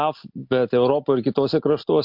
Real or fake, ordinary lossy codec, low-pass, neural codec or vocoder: real; MP3, 48 kbps; 5.4 kHz; none